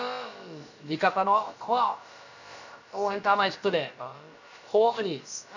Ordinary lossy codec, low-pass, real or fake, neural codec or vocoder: none; 7.2 kHz; fake; codec, 16 kHz, about 1 kbps, DyCAST, with the encoder's durations